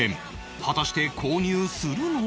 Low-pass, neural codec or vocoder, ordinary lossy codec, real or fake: none; none; none; real